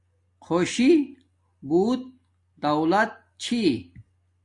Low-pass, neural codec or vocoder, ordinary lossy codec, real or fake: 10.8 kHz; none; AAC, 48 kbps; real